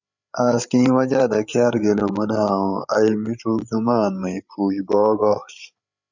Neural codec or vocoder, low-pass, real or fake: codec, 16 kHz, 8 kbps, FreqCodec, larger model; 7.2 kHz; fake